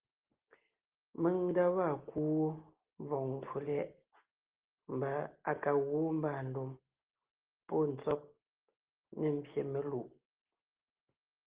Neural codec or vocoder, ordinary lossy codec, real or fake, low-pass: none; Opus, 16 kbps; real; 3.6 kHz